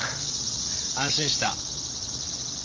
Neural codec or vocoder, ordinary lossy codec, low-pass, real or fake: codec, 16 kHz, 16 kbps, FreqCodec, larger model; Opus, 32 kbps; 7.2 kHz; fake